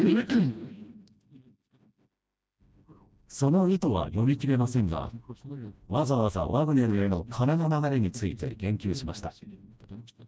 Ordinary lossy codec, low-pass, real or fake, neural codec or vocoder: none; none; fake; codec, 16 kHz, 1 kbps, FreqCodec, smaller model